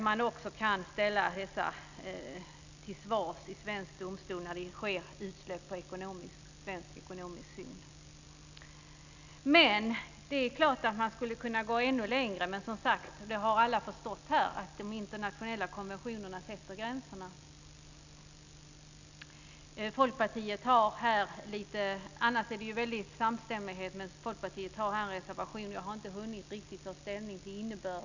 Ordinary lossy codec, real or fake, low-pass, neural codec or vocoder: none; real; 7.2 kHz; none